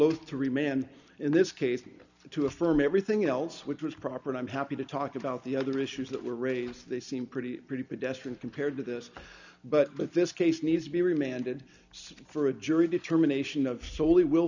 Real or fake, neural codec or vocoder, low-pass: real; none; 7.2 kHz